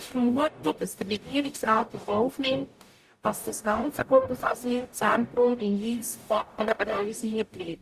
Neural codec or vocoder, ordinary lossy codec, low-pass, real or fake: codec, 44.1 kHz, 0.9 kbps, DAC; Opus, 64 kbps; 14.4 kHz; fake